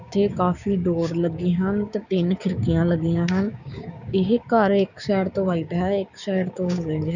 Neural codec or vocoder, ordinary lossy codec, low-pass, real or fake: codec, 16 kHz, 6 kbps, DAC; none; 7.2 kHz; fake